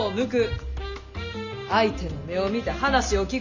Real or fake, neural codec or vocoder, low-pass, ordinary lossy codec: real; none; 7.2 kHz; none